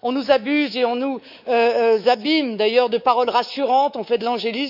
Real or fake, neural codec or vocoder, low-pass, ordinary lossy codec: fake; codec, 24 kHz, 3.1 kbps, DualCodec; 5.4 kHz; none